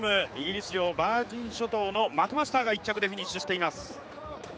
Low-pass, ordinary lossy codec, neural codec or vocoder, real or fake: none; none; codec, 16 kHz, 4 kbps, X-Codec, HuBERT features, trained on general audio; fake